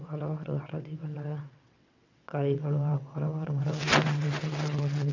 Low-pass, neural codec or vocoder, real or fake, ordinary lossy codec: 7.2 kHz; vocoder, 22.05 kHz, 80 mel bands, WaveNeXt; fake; none